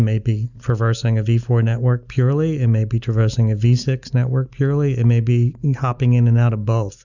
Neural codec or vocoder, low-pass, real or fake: none; 7.2 kHz; real